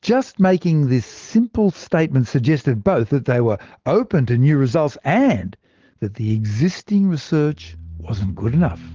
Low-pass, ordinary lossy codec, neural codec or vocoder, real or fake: 7.2 kHz; Opus, 16 kbps; none; real